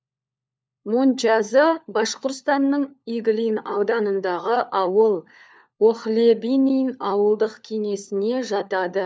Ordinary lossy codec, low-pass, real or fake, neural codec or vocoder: none; none; fake; codec, 16 kHz, 4 kbps, FunCodec, trained on LibriTTS, 50 frames a second